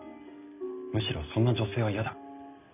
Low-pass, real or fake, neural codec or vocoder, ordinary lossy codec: 3.6 kHz; real; none; none